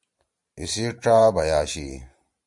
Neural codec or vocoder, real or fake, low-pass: vocoder, 44.1 kHz, 128 mel bands every 512 samples, BigVGAN v2; fake; 10.8 kHz